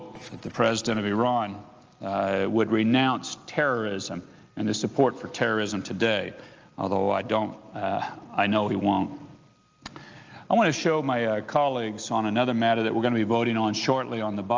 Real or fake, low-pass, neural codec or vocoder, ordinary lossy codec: real; 7.2 kHz; none; Opus, 24 kbps